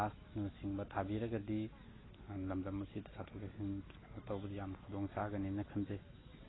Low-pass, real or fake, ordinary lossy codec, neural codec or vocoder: 7.2 kHz; real; AAC, 16 kbps; none